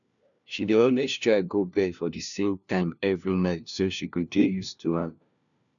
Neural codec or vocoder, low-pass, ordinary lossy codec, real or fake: codec, 16 kHz, 1 kbps, FunCodec, trained on LibriTTS, 50 frames a second; 7.2 kHz; none; fake